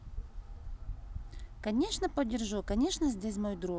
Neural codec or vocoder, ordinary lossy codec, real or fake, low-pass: none; none; real; none